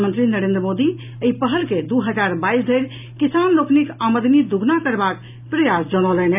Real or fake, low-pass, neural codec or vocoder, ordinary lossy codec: real; 3.6 kHz; none; none